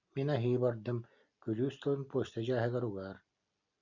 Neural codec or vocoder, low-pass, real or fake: none; 7.2 kHz; real